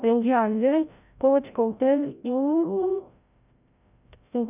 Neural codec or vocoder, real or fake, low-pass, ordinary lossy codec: codec, 16 kHz, 0.5 kbps, FreqCodec, larger model; fake; 3.6 kHz; none